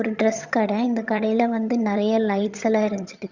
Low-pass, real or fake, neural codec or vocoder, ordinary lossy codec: 7.2 kHz; fake; vocoder, 22.05 kHz, 80 mel bands, HiFi-GAN; Opus, 64 kbps